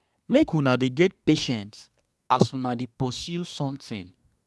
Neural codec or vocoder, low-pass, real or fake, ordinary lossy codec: codec, 24 kHz, 1 kbps, SNAC; none; fake; none